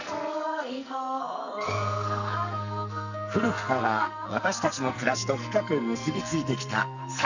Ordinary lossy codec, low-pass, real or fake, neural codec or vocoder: none; 7.2 kHz; fake; codec, 44.1 kHz, 2.6 kbps, SNAC